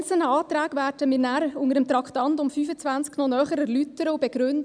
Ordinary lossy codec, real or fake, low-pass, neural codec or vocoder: none; real; 9.9 kHz; none